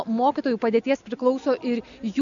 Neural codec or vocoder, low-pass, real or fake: none; 7.2 kHz; real